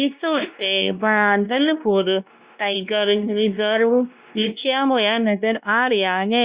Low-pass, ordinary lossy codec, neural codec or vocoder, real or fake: 3.6 kHz; Opus, 64 kbps; codec, 16 kHz, 1 kbps, X-Codec, WavLM features, trained on Multilingual LibriSpeech; fake